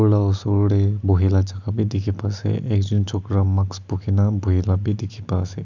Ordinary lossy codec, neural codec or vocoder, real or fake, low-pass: none; none; real; 7.2 kHz